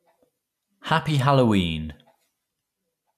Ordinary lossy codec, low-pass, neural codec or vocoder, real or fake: none; 14.4 kHz; none; real